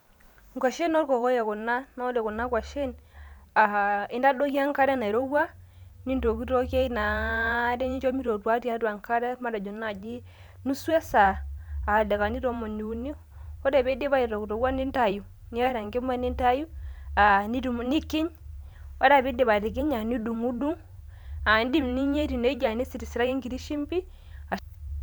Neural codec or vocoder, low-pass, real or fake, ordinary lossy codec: vocoder, 44.1 kHz, 128 mel bands every 512 samples, BigVGAN v2; none; fake; none